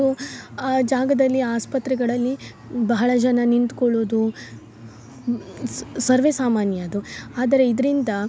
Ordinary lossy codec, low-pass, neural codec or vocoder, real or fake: none; none; none; real